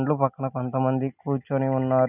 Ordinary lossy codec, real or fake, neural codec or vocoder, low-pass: none; real; none; 3.6 kHz